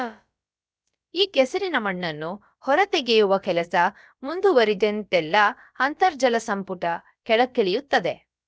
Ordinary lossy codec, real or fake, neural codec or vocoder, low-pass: none; fake; codec, 16 kHz, about 1 kbps, DyCAST, with the encoder's durations; none